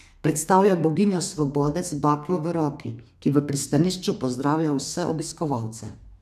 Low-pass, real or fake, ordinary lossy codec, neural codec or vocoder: 14.4 kHz; fake; none; codec, 32 kHz, 1.9 kbps, SNAC